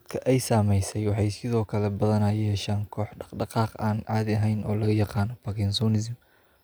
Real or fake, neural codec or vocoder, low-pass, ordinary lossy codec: real; none; none; none